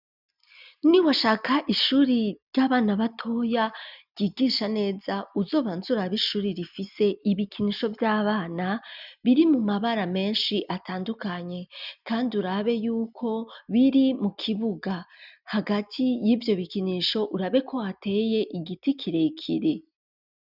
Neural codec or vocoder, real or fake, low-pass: none; real; 5.4 kHz